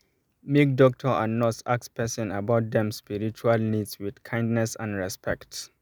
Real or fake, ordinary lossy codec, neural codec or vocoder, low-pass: real; none; none; none